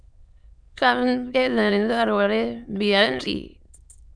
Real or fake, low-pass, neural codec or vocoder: fake; 9.9 kHz; autoencoder, 22.05 kHz, a latent of 192 numbers a frame, VITS, trained on many speakers